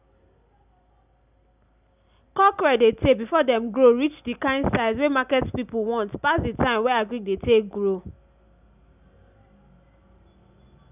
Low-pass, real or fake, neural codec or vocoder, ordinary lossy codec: 3.6 kHz; real; none; none